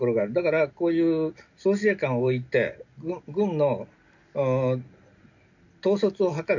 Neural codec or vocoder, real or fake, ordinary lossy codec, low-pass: none; real; none; 7.2 kHz